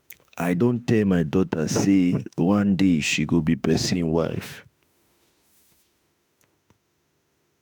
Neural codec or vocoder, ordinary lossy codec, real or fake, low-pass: autoencoder, 48 kHz, 32 numbers a frame, DAC-VAE, trained on Japanese speech; none; fake; none